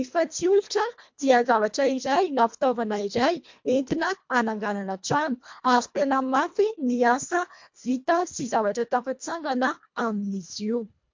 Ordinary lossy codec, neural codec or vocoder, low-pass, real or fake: MP3, 48 kbps; codec, 24 kHz, 1.5 kbps, HILCodec; 7.2 kHz; fake